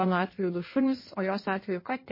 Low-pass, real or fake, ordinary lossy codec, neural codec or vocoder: 5.4 kHz; fake; MP3, 24 kbps; codec, 16 kHz in and 24 kHz out, 1.1 kbps, FireRedTTS-2 codec